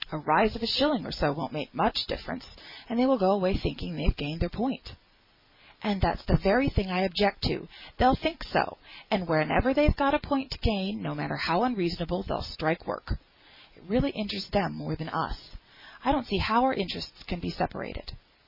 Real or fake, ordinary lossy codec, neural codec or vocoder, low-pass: real; MP3, 32 kbps; none; 5.4 kHz